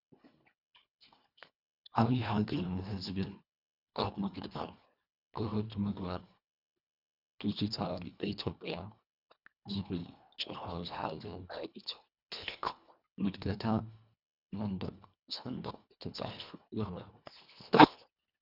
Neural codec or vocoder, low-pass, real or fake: codec, 24 kHz, 1.5 kbps, HILCodec; 5.4 kHz; fake